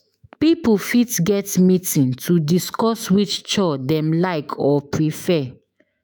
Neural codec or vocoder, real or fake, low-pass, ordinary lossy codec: autoencoder, 48 kHz, 128 numbers a frame, DAC-VAE, trained on Japanese speech; fake; none; none